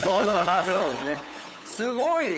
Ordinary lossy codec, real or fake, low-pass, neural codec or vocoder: none; fake; none; codec, 16 kHz, 8 kbps, FunCodec, trained on LibriTTS, 25 frames a second